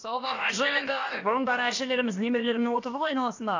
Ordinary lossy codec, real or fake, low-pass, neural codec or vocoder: AAC, 48 kbps; fake; 7.2 kHz; codec, 16 kHz, about 1 kbps, DyCAST, with the encoder's durations